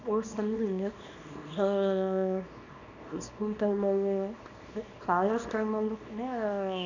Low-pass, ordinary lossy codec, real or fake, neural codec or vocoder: 7.2 kHz; none; fake; codec, 24 kHz, 0.9 kbps, WavTokenizer, small release